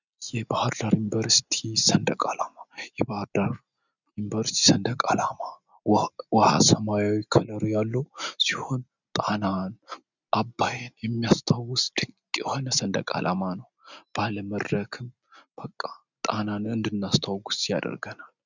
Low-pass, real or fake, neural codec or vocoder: 7.2 kHz; real; none